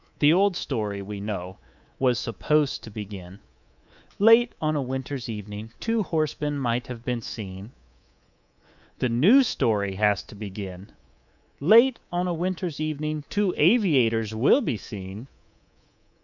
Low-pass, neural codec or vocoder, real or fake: 7.2 kHz; codec, 24 kHz, 3.1 kbps, DualCodec; fake